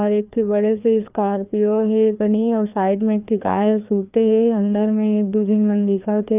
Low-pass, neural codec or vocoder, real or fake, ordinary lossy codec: 3.6 kHz; codec, 16 kHz, 2 kbps, FreqCodec, larger model; fake; none